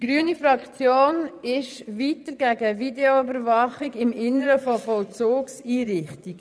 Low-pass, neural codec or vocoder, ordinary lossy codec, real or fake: none; vocoder, 22.05 kHz, 80 mel bands, Vocos; none; fake